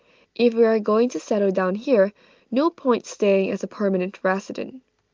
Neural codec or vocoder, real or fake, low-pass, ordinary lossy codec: none; real; 7.2 kHz; Opus, 32 kbps